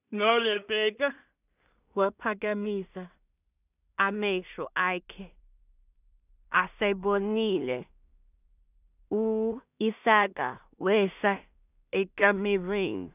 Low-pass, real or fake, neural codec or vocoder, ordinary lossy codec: 3.6 kHz; fake; codec, 16 kHz in and 24 kHz out, 0.4 kbps, LongCat-Audio-Codec, two codebook decoder; none